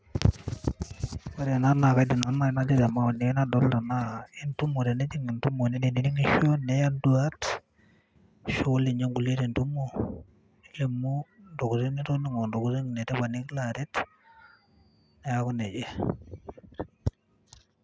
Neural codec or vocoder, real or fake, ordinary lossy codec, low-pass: none; real; none; none